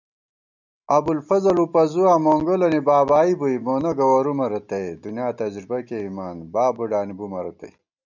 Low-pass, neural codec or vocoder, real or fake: 7.2 kHz; none; real